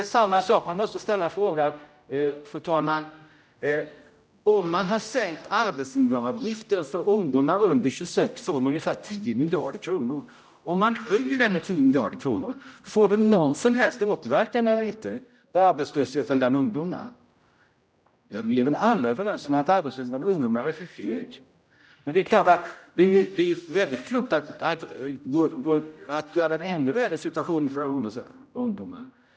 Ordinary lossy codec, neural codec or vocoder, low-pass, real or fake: none; codec, 16 kHz, 0.5 kbps, X-Codec, HuBERT features, trained on general audio; none; fake